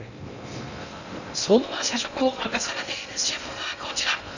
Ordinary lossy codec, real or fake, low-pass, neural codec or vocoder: none; fake; 7.2 kHz; codec, 16 kHz in and 24 kHz out, 0.6 kbps, FocalCodec, streaming, 2048 codes